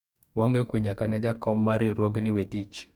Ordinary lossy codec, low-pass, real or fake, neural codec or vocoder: none; 19.8 kHz; fake; codec, 44.1 kHz, 2.6 kbps, DAC